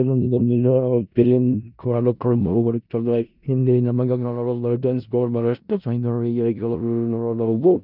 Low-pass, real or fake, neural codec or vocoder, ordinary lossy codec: 5.4 kHz; fake; codec, 16 kHz in and 24 kHz out, 0.4 kbps, LongCat-Audio-Codec, four codebook decoder; none